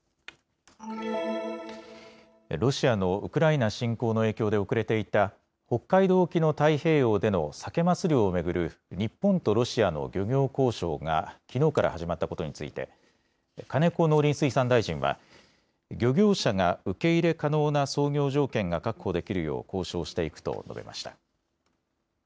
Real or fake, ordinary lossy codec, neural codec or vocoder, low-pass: real; none; none; none